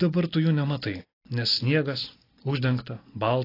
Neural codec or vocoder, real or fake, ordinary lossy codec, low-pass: none; real; AAC, 24 kbps; 5.4 kHz